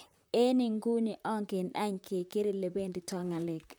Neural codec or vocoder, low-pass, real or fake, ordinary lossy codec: vocoder, 44.1 kHz, 128 mel bands, Pupu-Vocoder; none; fake; none